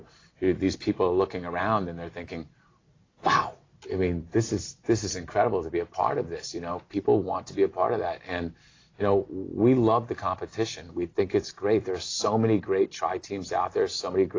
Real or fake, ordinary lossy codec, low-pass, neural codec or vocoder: real; AAC, 32 kbps; 7.2 kHz; none